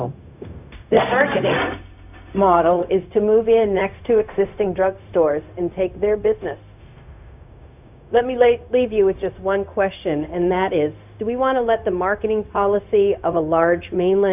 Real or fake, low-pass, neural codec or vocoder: fake; 3.6 kHz; codec, 16 kHz, 0.4 kbps, LongCat-Audio-Codec